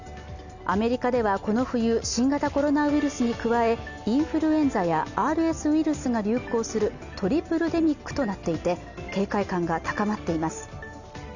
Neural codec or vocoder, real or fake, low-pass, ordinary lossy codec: none; real; 7.2 kHz; none